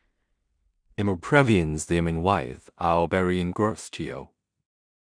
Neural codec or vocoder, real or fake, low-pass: codec, 16 kHz in and 24 kHz out, 0.4 kbps, LongCat-Audio-Codec, two codebook decoder; fake; 9.9 kHz